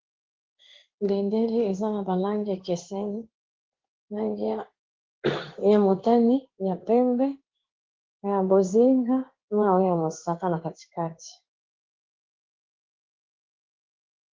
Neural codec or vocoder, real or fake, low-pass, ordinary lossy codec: codec, 16 kHz in and 24 kHz out, 1 kbps, XY-Tokenizer; fake; 7.2 kHz; Opus, 16 kbps